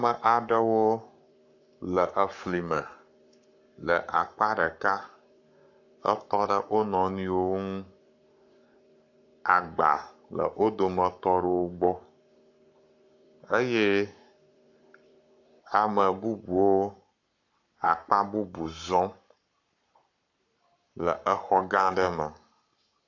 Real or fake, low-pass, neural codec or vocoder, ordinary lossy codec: fake; 7.2 kHz; codec, 44.1 kHz, 7.8 kbps, Pupu-Codec; AAC, 48 kbps